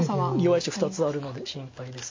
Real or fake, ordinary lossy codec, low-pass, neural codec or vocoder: real; MP3, 32 kbps; 7.2 kHz; none